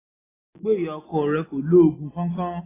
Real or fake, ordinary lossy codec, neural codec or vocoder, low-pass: real; AAC, 16 kbps; none; 3.6 kHz